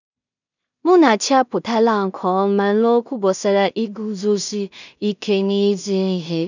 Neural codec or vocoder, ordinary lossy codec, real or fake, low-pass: codec, 16 kHz in and 24 kHz out, 0.4 kbps, LongCat-Audio-Codec, two codebook decoder; none; fake; 7.2 kHz